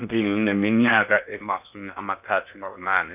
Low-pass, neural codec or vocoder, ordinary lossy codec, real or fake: 3.6 kHz; codec, 16 kHz in and 24 kHz out, 0.6 kbps, FocalCodec, streaming, 4096 codes; none; fake